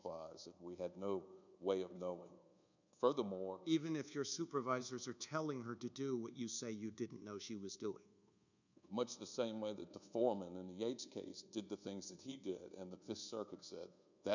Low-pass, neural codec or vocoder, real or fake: 7.2 kHz; codec, 24 kHz, 1.2 kbps, DualCodec; fake